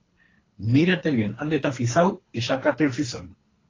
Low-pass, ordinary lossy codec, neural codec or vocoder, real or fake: 7.2 kHz; AAC, 32 kbps; codec, 24 kHz, 3 kbps, HILCodec; fake